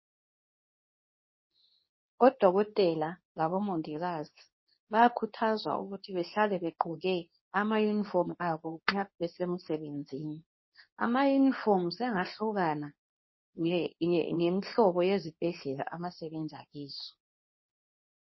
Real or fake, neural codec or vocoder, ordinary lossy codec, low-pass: fake; codec, 24 kHz, 0.9 kbps, WavTokenizer, medium speech release version 2; MP3, 24 kbps; 7.2 kHz